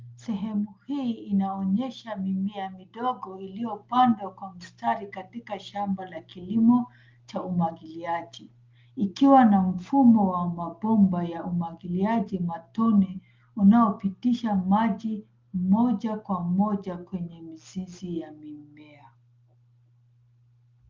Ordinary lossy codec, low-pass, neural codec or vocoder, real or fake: Opus, 32 kbps; 7.2 kHz; none; real